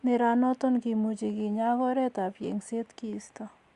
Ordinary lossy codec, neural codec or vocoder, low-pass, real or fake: Opus, 64 kbps; none; 10.8 kHz; real